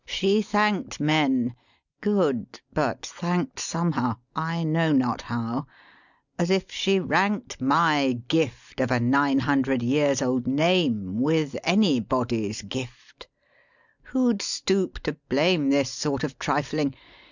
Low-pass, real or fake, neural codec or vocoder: 7.2 kHz; real; none